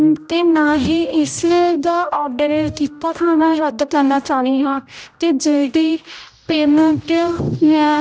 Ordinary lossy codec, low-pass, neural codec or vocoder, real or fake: none; none; codec, 16 kHz, 0.5 kbps, X-Codec, HuBERT features, trained on general audio; fake